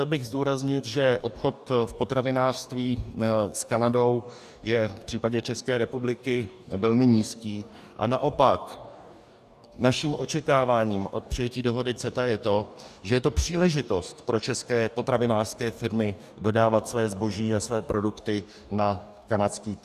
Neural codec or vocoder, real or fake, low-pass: codec, 44.1 kHz, 2.6 kbps, DAC; fake; 14.4 kHz